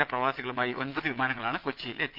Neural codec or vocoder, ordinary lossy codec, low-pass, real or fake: vocoder, 22.05 kHz, 80 mel bands, Vocos; Opus, 32 kbps; 5.4 kHz; fake